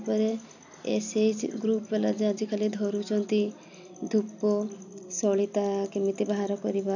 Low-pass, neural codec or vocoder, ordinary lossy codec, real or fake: 7.2 kHz; none; none; real